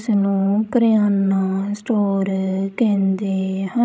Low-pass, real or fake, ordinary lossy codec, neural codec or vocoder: none; fake; none; codec, 16 kHz, 16 kbps, FreqCodec, larger model